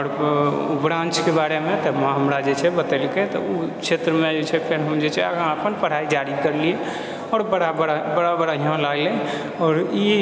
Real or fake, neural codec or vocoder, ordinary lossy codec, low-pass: real; none; none; none